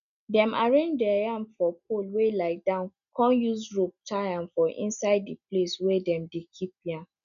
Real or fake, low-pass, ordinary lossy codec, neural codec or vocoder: real; 7.2 kHz; none; none